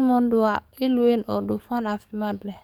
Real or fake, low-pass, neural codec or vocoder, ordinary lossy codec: fake; 19.8 kHz; codec, 44.1 kHz, 7.8 kbps, DAC; none